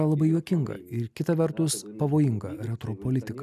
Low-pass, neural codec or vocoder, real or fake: 14.4 kHz; none; real